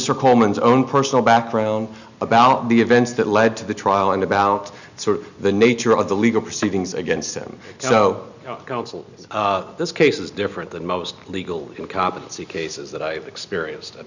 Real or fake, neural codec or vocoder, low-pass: real; none; 7.2 kHz